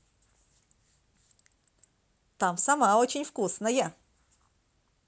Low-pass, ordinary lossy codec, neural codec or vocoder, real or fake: none; none; none; real